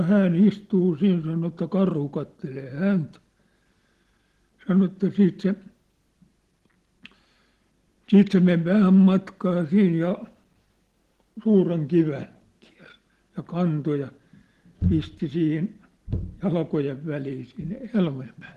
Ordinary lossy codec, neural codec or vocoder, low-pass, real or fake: Opus, 16 kbps; none; 14.4 kHz; real